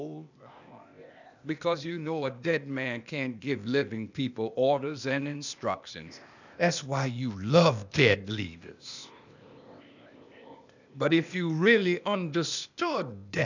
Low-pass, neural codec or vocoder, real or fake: 7.2 kHz; codec, 16 kHz, 0.8 kbps, ZipCodec; fake